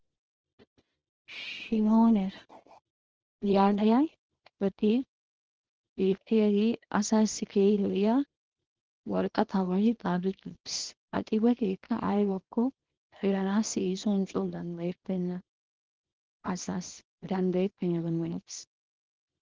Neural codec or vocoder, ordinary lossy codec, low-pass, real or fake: codec, 24 kHz, 0.9 kbps, WavTokenizer, small release; Opus, 16 kbps; 7.2 kHz; fake